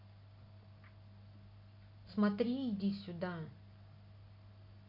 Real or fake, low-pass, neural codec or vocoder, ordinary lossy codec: real; 5.4 kHz; none; none